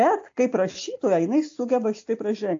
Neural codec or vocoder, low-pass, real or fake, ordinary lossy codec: none; 7.2 kHz; real; AAC, 48 kbps